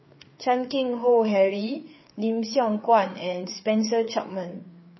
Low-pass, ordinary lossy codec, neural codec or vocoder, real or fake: 7.2 kHz; MP3, 24 kbps; codec, 16 kHz, 4 kbps, FreqCodec, larger model; fake